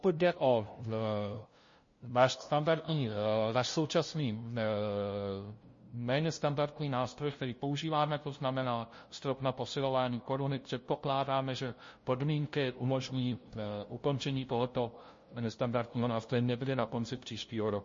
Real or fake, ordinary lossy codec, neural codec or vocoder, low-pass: fake; MP3, 32 kbps; codec, 16 kHz, 0.5 kbps, FunCodec, trained on LibriTTS, 25 frames a second; 7.2 kHz